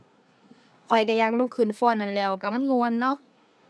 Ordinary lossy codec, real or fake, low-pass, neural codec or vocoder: none; fake; none; codec, 24 kHz, 1 kbps, SNAC